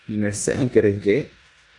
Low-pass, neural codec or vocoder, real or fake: 10.8 kHz; codec, 16 kHz in and 24 kHz out, 0.9 kbps, LongCat-Audio-Codec, four codebook decoder; fake